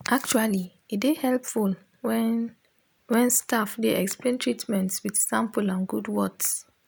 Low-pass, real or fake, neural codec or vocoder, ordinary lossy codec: none; real; none; none